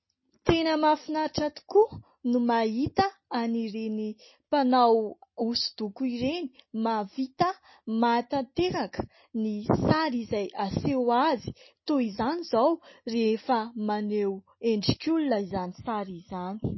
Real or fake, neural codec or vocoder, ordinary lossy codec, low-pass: real; none; MP3, 24 kbps; 7.2 kHz